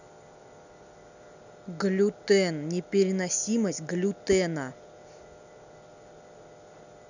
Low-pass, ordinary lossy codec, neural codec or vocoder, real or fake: 7.2 kHz; none; none; real